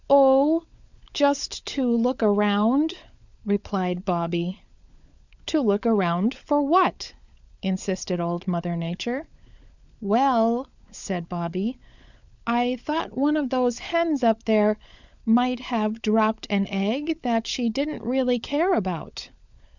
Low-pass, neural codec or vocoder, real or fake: 7.2 kHz; codec, 16 kHz, 16 kbps, FunCodec, trained on LibriTTS, 50 frames a second; fake